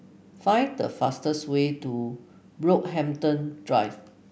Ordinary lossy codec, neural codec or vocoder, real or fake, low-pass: none; none; real; none